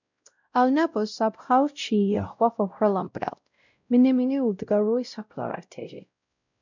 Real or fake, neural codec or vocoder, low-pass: fake; codec, 16 kHz, 0.5 kbps, X-Codec, WavLM features, trained on Multilingual LibriSpeech; 7.2 kHz